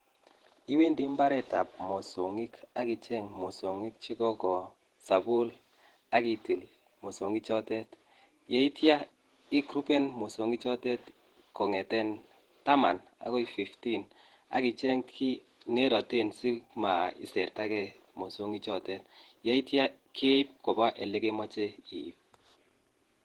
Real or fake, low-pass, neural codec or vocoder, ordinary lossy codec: fake; 19.8 kHz; vocoder, 48 kHz, 128 mel bands, Vocos; Opus, 16 kbps